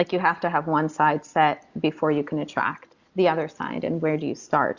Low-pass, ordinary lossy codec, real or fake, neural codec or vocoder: 7.2 kHz; Opus, 64 kbps; real; none